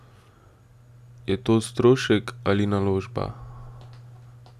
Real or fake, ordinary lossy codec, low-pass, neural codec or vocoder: real; none; 14.4 kHz; none